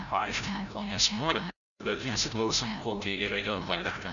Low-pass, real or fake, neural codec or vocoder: 7.2 kHz; fake; codec, 16 kHz, 0.5 kbps, FreqCodec, larger model